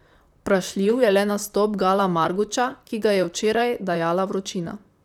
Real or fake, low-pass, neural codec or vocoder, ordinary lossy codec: fake; 19.8 kHz; vocoder, 44.1 kHz, 128 mel bands, Pupu-Vocoder; none